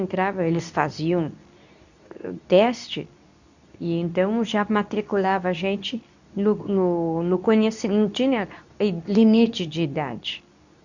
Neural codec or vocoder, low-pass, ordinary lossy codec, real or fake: codec, 24 kHz, 0.9 kbps, WavTokenizer, medium speech release version 2; 7.2 kHz; none; fake